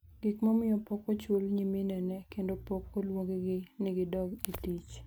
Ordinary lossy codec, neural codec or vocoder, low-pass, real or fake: none; none; none; real